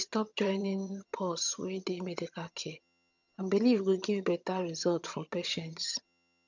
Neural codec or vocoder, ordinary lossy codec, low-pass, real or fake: vocoder, 22.05 kHz, 80 mel bands, HiFi-GAN; none; 7.2 kHz; fake